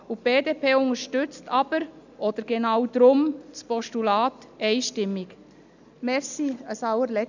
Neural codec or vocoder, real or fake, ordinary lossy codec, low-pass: none; real; none; 7.2 kHz